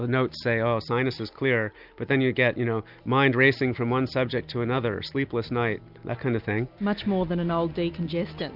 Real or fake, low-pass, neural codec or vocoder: real; 5.4 kHz; none